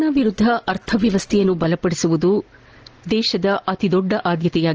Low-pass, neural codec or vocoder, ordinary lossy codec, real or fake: 7.2 kHz; none; Opus, 16 kbps; real